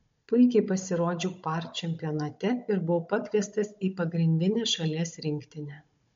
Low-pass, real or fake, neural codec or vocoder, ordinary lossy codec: 7.2 kHz; fake; codec, 16 kHz, 16 kbps, FunCodec, trained on Chinese and English, 50 frames a second; MP3, 48 kbps